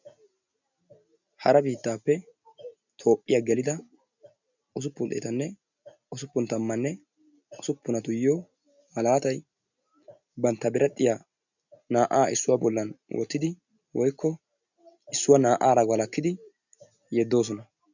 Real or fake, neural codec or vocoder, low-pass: real; none; 7.2 kHz